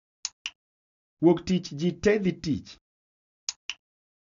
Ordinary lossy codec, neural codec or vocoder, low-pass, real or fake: none; none; 7.2 kHz; real